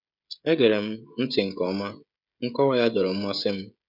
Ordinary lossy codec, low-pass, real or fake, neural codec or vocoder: none; 5.4 kHz; fake; codec, 16 kHz, 16 kbps, FreqCodec, smaller model